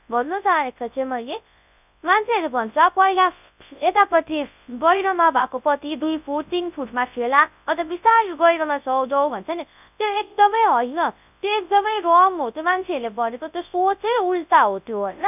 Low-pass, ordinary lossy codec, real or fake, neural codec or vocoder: 3.6 kHz; none; fake; codec, 24 kHz, 0.9 kbps, WavTokenizer, large speech release